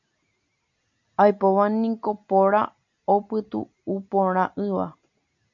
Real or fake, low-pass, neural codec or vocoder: real; 7.2 kHz; none